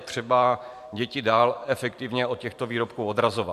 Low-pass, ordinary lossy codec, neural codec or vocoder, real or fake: 14.4 kHz; MP3, 96 kbps; vocoder, 44.1 kHz, 128 mel bands every 512 samples, BigVGAN v2; fake